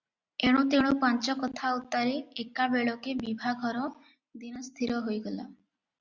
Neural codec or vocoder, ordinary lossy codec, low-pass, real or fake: none; Opus, 64 kbps; 7.2 kHz; real